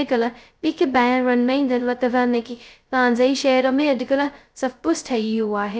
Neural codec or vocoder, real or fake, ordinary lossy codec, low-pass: codec, 16 kHz, 0.2 kbps, FocalCodec; fake; none; none